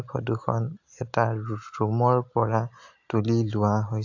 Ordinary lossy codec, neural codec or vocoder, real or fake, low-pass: AAC, 48 kbps; none; real; 7.2 kHz